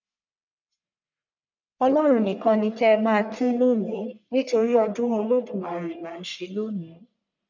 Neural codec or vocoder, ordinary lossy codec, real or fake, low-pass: codec, 44.1 kHz, 1.7 kbps, Pupu-Codec; none; fake; 7.2 kHz